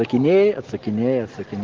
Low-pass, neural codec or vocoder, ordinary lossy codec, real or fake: 7.2 kHz; none; Opus, 16 kbps; real